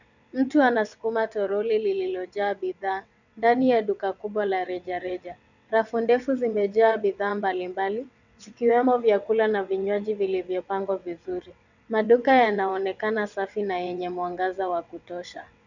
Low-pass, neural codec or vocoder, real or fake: 7.2 kHz; vocoder, 22.05 kHz, 80 mel bands, WaveNeXt; fake